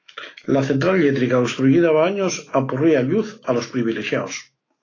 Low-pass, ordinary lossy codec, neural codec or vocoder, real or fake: 7.2 kHz; AAC, 32 kbps; autoencoder, 48 kHz, 128 numbers a frame, DAC-VAE, trained on Japanese speech; fake